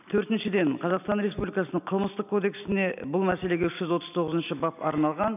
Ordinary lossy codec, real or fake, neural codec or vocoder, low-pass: none; real; none; 3.6 kHz